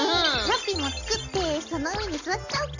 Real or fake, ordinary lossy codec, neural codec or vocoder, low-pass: real; AAC, 48 kbps; none; 7.2 kHz